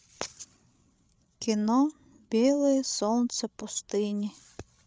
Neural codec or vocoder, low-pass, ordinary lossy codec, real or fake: codec, 16 kHz, 16 kbps, FreqCodec, larger model; none; none; fake